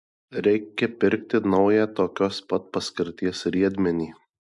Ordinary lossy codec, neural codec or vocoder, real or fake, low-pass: MP3, 64 kbps; none; real; 10.8 kHz